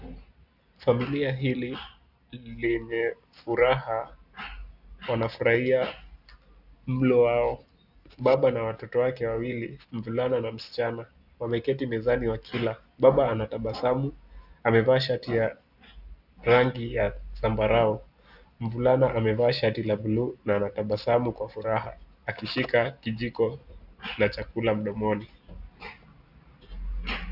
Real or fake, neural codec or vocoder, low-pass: real; none; 5.4 kHz